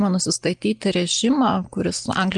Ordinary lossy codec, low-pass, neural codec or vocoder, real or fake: Opus, 24 kbps; 9.9 kHz; none; real